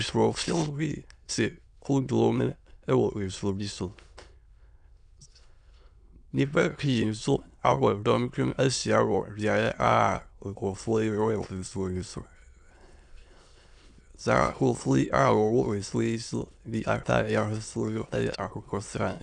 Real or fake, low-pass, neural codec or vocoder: fake; 9.9 kHz; autoencoder, 22.05 kHz, a latent of 192 numbers a frame, VITS, trained on many speakers